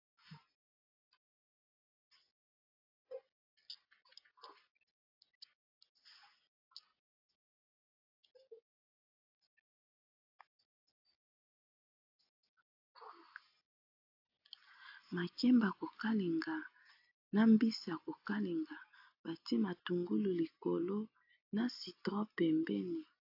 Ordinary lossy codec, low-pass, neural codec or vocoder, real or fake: AAC, 32 kbps; 5.4 kHz; none; real